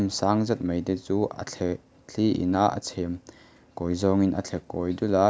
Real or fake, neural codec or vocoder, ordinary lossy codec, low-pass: real; none; none; none